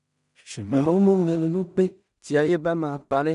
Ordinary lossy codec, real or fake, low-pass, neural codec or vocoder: none; fake; 10.8 kHz; codec, 16 kHz in and 24 kHz out, 0.4 kbps, LongCat-Audio-Codec, two codebook decoder